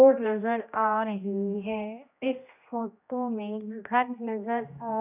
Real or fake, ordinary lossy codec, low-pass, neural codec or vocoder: fake; none; 3.6 kHz; codec, 16 kHz, 1 kbps, X-Codec, HuBERT features, trained on general audio